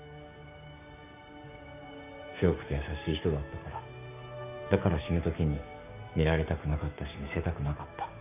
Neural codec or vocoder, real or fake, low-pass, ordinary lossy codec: codec, 16 kHz, 6 kbps, DAC; fake; 3.6 kHz; none